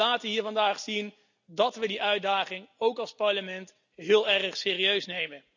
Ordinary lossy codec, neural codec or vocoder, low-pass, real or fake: none; none; 7.2 kHz; real